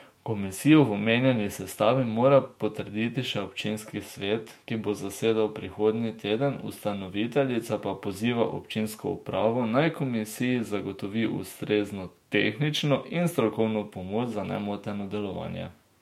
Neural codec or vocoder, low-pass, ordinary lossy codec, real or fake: autoencoder, 48 kHz, 128 numbers a frame, DAC-VAE, trained on Japanese speech; 19.8 kHz; MP3, 64 kbps; fake